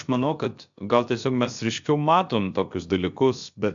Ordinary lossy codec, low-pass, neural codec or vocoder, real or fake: MP3, 64 kbps; 7.2 kHz; codec, 16 kHz, about 1 kbps, DyCAST, with the encoder's durations; fake